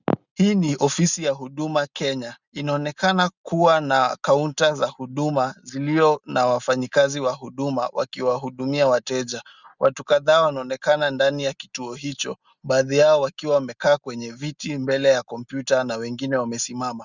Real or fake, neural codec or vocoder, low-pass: real; none; 7.2 kHz